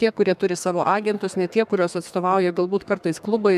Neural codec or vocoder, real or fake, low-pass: codec, 32 kHz, 1.9 kbps, SNAC; fake; 14.4 kHz